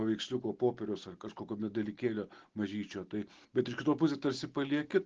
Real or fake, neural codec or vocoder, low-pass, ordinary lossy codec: real; none; 7.2 kHz; Opus, 16 kbps